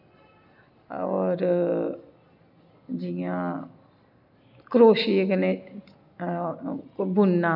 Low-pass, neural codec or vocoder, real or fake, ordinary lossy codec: 5.4 kHz; none; real; none